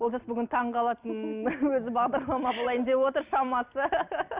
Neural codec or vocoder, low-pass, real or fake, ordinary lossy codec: none; 3.6 kHz; real; Opus, 64 kbps